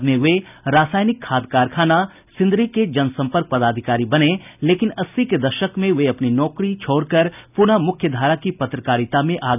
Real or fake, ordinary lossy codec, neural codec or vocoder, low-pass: real; none; none; 3.6 kHz